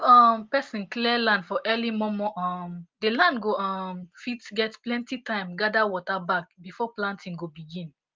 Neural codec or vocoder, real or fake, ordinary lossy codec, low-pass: none; real; Opus, 32 kbps; 7.2 kHz